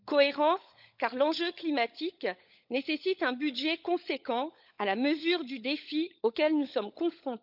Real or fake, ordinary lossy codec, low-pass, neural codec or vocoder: fake; none; 5.4 kHz; codec, 16 kHz, 16 kbps, FunCodec, trained on LibriTTS, 50 frames a second